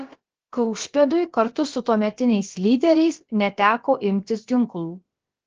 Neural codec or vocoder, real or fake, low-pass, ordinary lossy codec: codec, 16 kHz, about 1 kbps, DyCAST, with the encoder's durations; fake; 7.2 kHz; Opus, 16 kbps